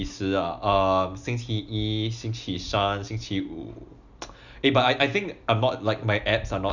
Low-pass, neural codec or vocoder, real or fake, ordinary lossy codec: 7.2 kHz; none; real; none